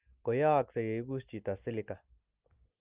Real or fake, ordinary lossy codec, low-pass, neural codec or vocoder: real; Opus, 32 kbps; 3.6 kHz; none